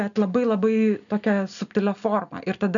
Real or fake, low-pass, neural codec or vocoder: real; 7.2 kHz; none